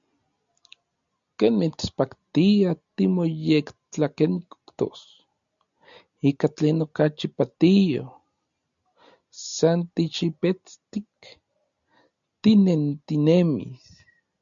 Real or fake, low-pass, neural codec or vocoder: real; 7.2 kHz; none